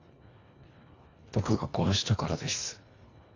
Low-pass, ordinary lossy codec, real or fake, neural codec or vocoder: 7.2 kHz; AAC, 48 kbps; fake; codec, 24 kHz, 1.5 kbps, HILCodec